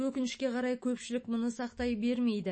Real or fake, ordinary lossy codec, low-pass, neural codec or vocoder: fake; MP3, 32 kbps; 9.9 kHz; autoencoder, 48 kHz, 128 numbers a frame, DAC-VAE, trained on Japanese speech